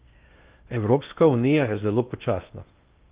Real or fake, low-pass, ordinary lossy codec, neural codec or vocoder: fake; 3.6 kHz; Opus, 32 kbps; codec, 16 kHz in and 24 kHz out, 0.6 kbps, FocalCodec, streaming, 4096 codes